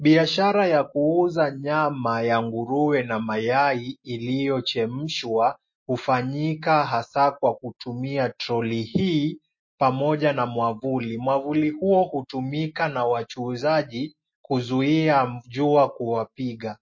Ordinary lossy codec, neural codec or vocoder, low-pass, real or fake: MP3, 32 kbps; none; 7.2 kHz; real